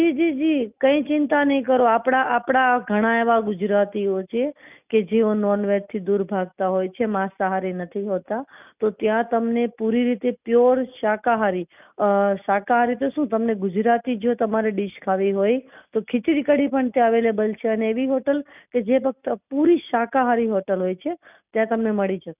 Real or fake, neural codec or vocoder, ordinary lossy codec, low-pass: real; none; none; 3.6 kHz